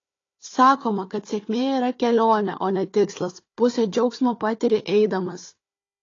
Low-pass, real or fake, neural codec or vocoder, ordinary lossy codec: 7.2 kHz; fake; codec, 16 kHz, 4 kbps, FunCodec, trained on Chinese and English, 50 frames a second; AAC, 32 kbps